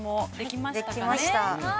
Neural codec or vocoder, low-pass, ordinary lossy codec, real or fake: none; none; none; real